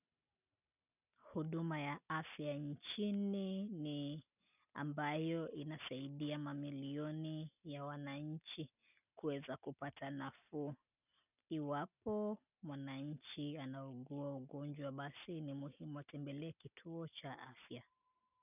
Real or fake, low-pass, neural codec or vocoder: real; 3.6 kHz; none